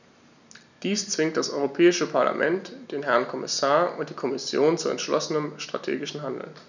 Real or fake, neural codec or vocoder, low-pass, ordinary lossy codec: real; none; 7.2 kHz; none